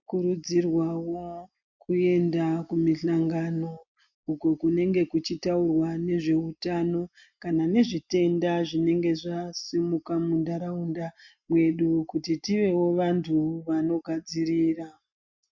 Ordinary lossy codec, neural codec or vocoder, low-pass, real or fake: MP3, 48 kbps; none; 7.2 kHz; real